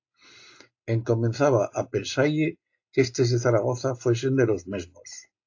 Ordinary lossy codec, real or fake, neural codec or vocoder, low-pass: AAC, 48 kbps; real; none; 7.2 kHz